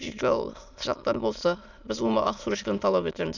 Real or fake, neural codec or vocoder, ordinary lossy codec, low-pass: fake; autoencoder, 22.05 kHz, a latent of 192 numbers a frame, VITS, trained on many speakers; none; 7.2 kHz